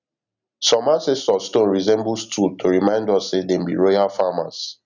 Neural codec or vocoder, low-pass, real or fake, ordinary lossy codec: none; 7.2 kHz; real; none